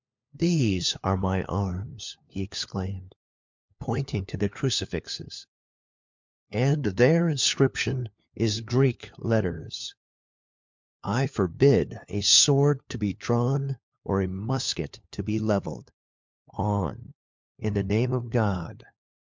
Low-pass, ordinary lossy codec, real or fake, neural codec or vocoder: 7.2 kHz; MP3, 64 kbps; fake; codec, 16 kHz, 4 kbps, FunCodec, trained on LibriTTS, 50 frames a second